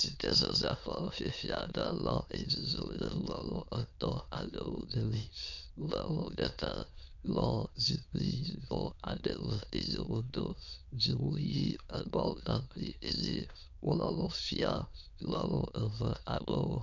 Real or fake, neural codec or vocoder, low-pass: fake; autoencoder, 22.05 kHz, a latent of 192 numbers a frame, VITS, trained on many speakers; 7.2 kHz